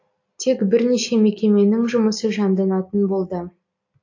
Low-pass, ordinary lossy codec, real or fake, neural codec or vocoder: 7.2 kHz; AAC, 48 kbps; real; none